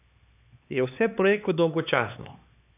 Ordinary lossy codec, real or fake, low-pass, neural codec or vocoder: none; fake; 3.6 kHz; codec, 16 kHz, 4 kbps, X-Codec, HuBERT features, trained on LibriSpeech